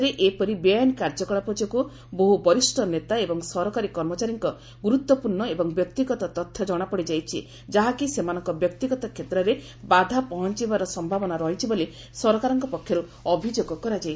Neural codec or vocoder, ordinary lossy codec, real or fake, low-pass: none; none; real; none